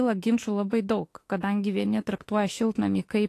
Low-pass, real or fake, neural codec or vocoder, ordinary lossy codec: 14.4 kHz; fake; autoencoder, 48 kHz, 32 numbers a frame, DAC-VAE, trained on Japanese speech; AAC, 48 kbps